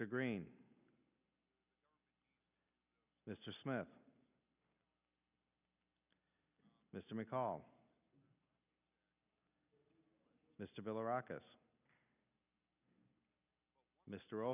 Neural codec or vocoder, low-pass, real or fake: none; 3.6 kHz; real